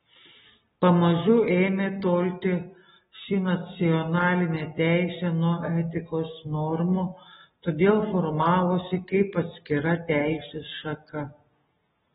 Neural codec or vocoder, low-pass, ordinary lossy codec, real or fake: none; 7.2 kHz; AAC, 16 kbps; real